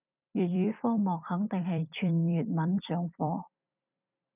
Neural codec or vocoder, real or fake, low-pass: vocoder, 44.1 kHz, 128 mel bands every 256 samples, BigVGAN v2; fake; 3.6 kHz